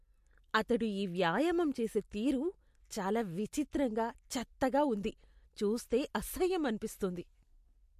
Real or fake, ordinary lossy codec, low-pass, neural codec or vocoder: real; MP3, 64 kbps; 14.4 kHz; none